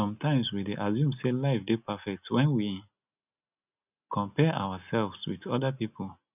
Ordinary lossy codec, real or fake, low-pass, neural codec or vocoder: none; real; 3.6 kHz; none